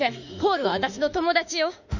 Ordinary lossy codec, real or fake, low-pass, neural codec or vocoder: none; fake; 7.2 kHz; autoencoder, 48 kHz, 32 numbers a frame, DAC-VAE, trained on Japanese speech